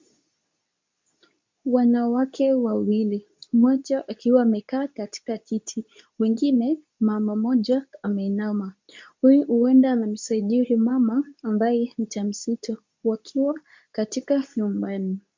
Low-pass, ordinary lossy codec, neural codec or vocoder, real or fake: 7.2 kHz; MP3, 64 kbps; codec, 24 kHz, 0.9 kbps, WavTokenizer, medium speech release version 2; fake